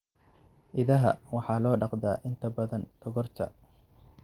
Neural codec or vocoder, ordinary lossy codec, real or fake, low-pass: none; Opus, 24 kbps; real; 19.8 kHz